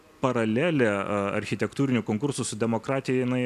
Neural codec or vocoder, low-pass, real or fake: vocoder, 44.1 kHz, 128 mel bands every 256 samples, BigVGAN v2; 14.4 kHz; fake